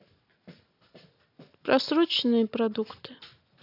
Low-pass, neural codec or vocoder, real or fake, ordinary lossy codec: 5.4 kHz; none; real; AAC, 32 kbps